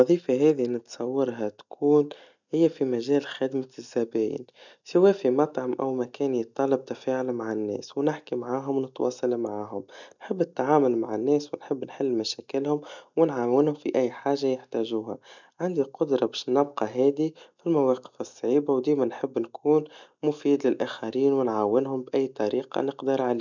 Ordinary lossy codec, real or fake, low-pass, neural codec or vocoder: none; real; 7.2 kHz; none